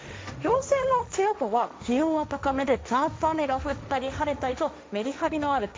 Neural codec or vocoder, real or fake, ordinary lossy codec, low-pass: codec, 16 kHz, 1.1 kbps, Voila-Tokenizer; fake; none; none